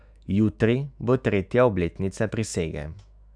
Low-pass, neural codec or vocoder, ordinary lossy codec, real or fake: 9.9 kHz; autoencoder, 48 kHz, 128 numbers a frame, DAC-VAE, trained on Japanese speech; none; fake